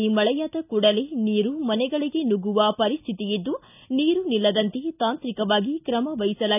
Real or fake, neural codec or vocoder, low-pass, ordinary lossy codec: real; none; 3.6 kHz; none